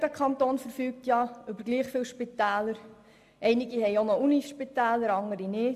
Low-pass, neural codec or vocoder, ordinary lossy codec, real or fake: 14.4 kHz; none; AAC, 96 kbps; real